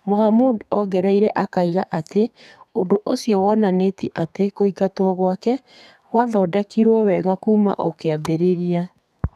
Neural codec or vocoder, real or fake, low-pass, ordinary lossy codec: codec, 32 kHz, 1.9 kbps, SNAC; fake; 14.4 kHz; none